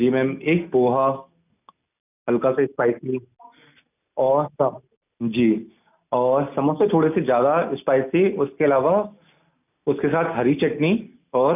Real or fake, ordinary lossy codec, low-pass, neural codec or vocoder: real; none; 3.6 kHz; none